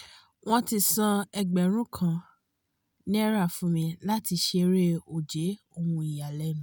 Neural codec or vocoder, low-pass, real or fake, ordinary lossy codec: none; none; real; none